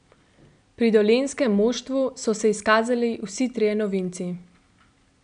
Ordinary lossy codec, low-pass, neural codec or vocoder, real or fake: none; 9.9 kHz; none; real